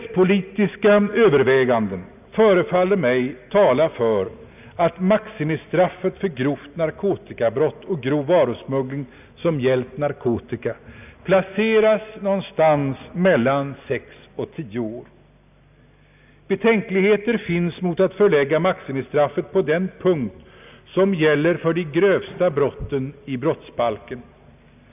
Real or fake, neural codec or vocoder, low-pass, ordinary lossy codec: real; none; 3.6 kHz; none